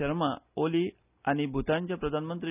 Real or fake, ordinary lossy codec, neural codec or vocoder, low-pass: real; none; none; 3.6 kHz